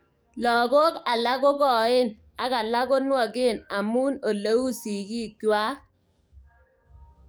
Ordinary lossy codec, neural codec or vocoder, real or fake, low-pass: none; codec, 44.1 kHz, 7.8 kbps, DAC; fake; none